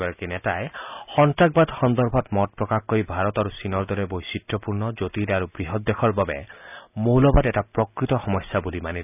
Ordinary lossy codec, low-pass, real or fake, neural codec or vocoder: none; 3.6 kHz; real; none